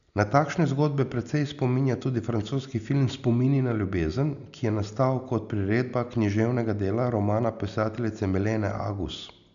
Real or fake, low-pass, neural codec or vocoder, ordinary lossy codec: real; 7.2 kHz; none; none